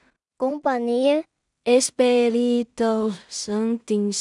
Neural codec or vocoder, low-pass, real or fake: codec, 16 kHz in and 24 kHz out, 0.4 kbps, LongCat-Audio-Codec, two codebook decoder; 10.8 kHz; fake